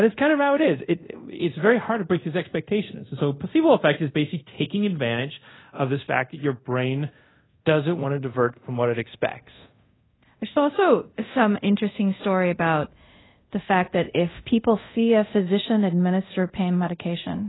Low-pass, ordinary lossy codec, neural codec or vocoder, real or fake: 7.2 kHz; AAC, 16 kbps; codec, 24 kHz, 0.5 kbps, DualCodec; fake